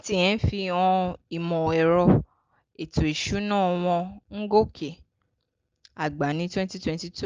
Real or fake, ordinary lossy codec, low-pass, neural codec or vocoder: real; Opus, 24 kbps; 7.2 kHz; none